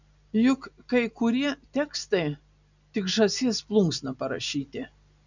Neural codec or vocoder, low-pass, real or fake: none; 7.2 kHz; real